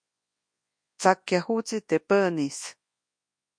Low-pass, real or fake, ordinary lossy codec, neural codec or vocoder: 9.9 kHz; fake; MP3, 48 kbps; codec, 24 kHz, 0.9 kbps, WavTokenizer, large speech release